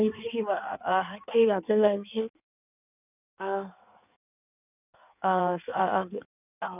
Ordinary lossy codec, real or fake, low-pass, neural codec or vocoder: none; fake; 3.6 kHz; codec, 16 kHz in and 24 kHz out, 1.1 kbps, FireRedTTS-2 codec